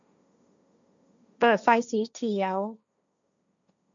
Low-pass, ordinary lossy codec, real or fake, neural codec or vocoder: 7.2 kHz; MP3, 96 kbps; fake; codec, 16 kHz, 1.1 kbps, Voila-Tokenizer